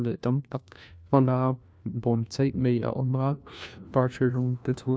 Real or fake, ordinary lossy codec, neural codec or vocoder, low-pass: fake; none; codec, 16 kHz, 1 kbps, FunCodec, trained on LibriTTS, 50 frames a second; none